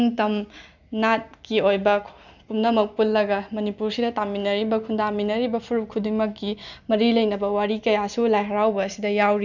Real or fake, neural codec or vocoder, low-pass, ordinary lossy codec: real; none; 7.2 kHz; none